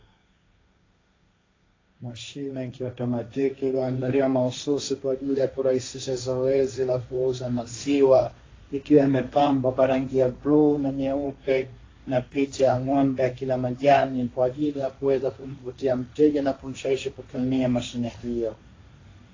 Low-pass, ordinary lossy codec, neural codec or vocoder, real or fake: 7.2 kHz; AAC, 32 kbps; codec, 16 kHz, 1.1 kbps, Voila-Tokenizer; fake